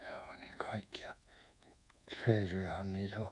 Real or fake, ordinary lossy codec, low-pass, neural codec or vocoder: fake; none; 10.8 kHz; codec, 24 kHz, 1.2 kbps, DualCodec